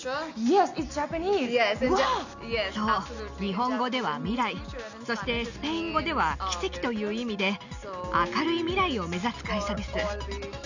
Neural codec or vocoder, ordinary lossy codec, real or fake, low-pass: none; none; real; 7.2 kHz